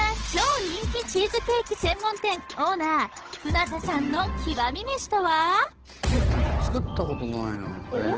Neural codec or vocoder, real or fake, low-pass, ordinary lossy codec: codec, 16 kHz, 8 kbps, FunCodec, trained on Chinese and English, 25 frames a second; fake; 7.2 kHz; Opus, 16 kbps